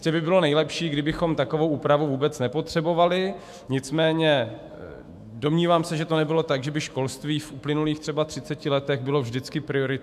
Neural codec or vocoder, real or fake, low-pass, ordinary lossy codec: autoencoder, 48 kHz, 128 numbers a frame, DAC-VAE, trained on Japanese speech; fake; 14.4 kHz; MP3, 96 kbps